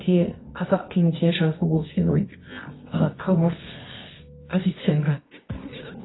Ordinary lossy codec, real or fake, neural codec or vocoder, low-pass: AAC, 16 kbps; fake; codec, 24 kHz, 0.9 kbps, WavTokenizer, medium music audio release; 7.2 kHz